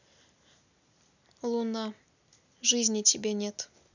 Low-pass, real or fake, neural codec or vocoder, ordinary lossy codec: 7.2 kHz; real; none; none